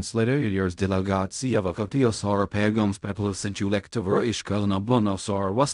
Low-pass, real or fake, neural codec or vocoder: 10.8 kHz; fake; codec, 16 kHz in and 24 kHz out, 0.4 kbps, LongCat-Audio-Codec, fine tuned four codebook decoder